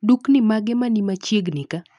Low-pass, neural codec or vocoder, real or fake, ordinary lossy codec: 10.8 kHz; none; real; none